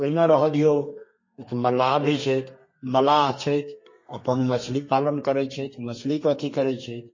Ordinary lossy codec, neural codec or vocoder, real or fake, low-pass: MP3, 32 kbps; codec, 32 kHz, 1.9 kbps, SNAC; fake; 7.2 kHz